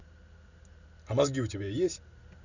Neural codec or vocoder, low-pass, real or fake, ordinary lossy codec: none; 7.2 kHz; real; none